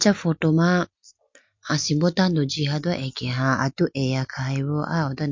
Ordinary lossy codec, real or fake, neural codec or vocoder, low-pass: MP3, 48 kbps; real; none; 7.2 kHz